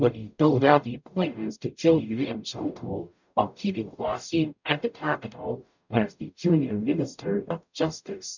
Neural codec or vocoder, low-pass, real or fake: codec, 44.1 kHz, 0.9 kbps, DAC; 7.2 kHz; fake